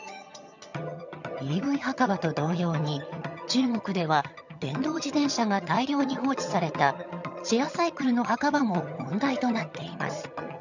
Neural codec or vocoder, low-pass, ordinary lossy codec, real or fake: vocoder, 22.05 kHz, 80 mel bands, HiFi-GAN; 7.2 kHz; none; fake